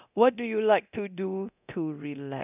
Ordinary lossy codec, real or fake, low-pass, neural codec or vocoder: none; fake; 3.6 kHz; codec, 24 kHz, 0.9 kbps, DualCodec